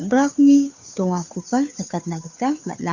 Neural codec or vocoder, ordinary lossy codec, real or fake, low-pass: codec, 16 kHz, 2 kbps, FunCodec, trained on Chinese and English, 25 frames a second; none; fake; 7.2 kHz